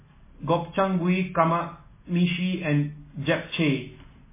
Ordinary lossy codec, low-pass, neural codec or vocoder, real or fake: MP3, 16 kbps; 3.6 kHz; none; real